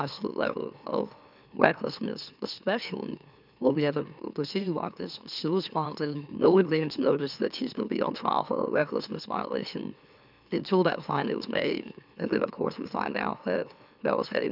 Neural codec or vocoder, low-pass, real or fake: autoencoder, 44.1 kHz, a latent of 192 numbers a frame, MeloTTS; 5.4 kHz; fake